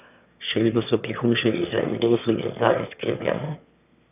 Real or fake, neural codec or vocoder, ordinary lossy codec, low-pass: fake; autoencoder, 22.05 kHz, a latent of 192 numbers a frame, VITS, trained on one speaker; none; 3.6 kHz